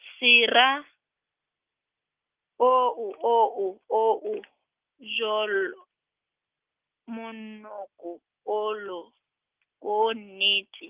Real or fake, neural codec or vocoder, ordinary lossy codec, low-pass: real; none; Opus, 32 kbps; 3.6 kHz